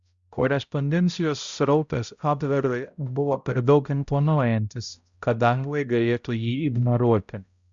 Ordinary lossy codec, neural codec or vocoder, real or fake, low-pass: Opus, 64 kbps; codec, 16 kHz, 0.5 kbps, X-Codec, HuBERT features, trained on balanced general audio; fake; 7.2 kHz